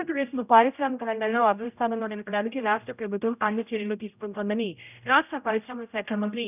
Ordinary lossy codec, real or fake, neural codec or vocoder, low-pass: none; fake; codec, 16 kHz, 0.5 kbps, X-Codec, HuBERT features, trained on general audio; 3.6 kHz